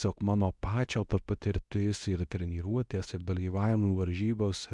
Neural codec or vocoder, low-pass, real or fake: codec, 24 kHz, 0.9 kbps, WavTokenizer, medium speech release version 2; 10.8 kHz; fake